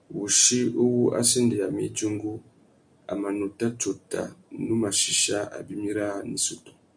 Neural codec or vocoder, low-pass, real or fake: none; 9.9 kHz; real